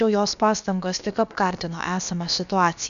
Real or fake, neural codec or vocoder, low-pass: fake; codec, 16 kHz, about 1 kbps, DyCAST, with the encoder's durations; 7.2 kHz